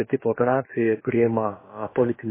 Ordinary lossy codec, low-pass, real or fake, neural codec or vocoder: MP3, 16 kbps; 3.6 kHz; fake; codec, 16 kHz, about 1 kbps, DyCAST, with the encoder's durations